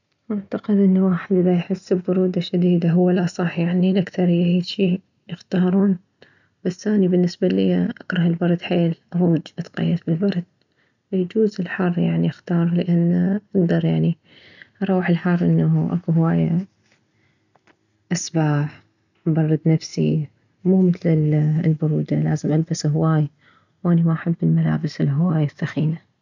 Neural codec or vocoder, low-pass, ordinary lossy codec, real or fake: none; 7.2 kHz; none; real